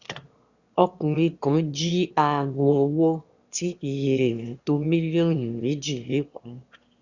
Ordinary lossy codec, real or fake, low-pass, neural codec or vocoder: Opus, 64 kbps; fake; 7.2 kHz; autoencoder, 22.05 kHz, a latent of 192 numbers a frame, VITS, trained on one speaker